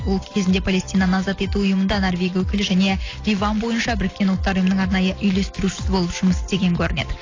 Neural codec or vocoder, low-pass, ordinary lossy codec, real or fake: none; 7.2 kHz; AAC, 32 kbps; real